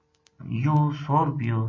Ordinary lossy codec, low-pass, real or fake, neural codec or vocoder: MP3, 32 kbps; 7.2 kHz; real; none